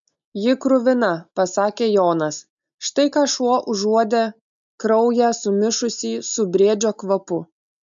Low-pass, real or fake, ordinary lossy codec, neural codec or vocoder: 7.2 kHz; real; MP3, 64 kbps; none